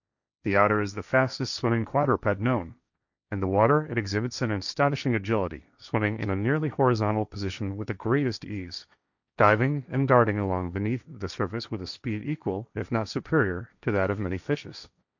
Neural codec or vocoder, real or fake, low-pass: codec, 16 kHz, 1.1 kbps, Voila-Tokenizer; fake; 7.2 kHz